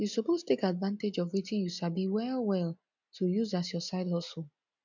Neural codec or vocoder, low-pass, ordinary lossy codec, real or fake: none; 7.2 kHz; none; real